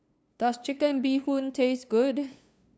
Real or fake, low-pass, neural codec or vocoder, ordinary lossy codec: fake; none; codec, 16 kHz, 2 kbps, FunCodec, trained on LibriTTS, 25 frames a second; none